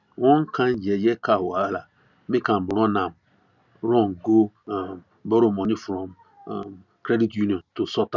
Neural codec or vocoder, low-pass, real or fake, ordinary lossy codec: none; 7.2 kHz; real; none